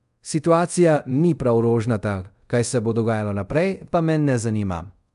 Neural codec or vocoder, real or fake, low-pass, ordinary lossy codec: codec, 24 kHz, 0.5 kbps, DualCodec; fake; 10.8 kHz; MP3, 64 kbps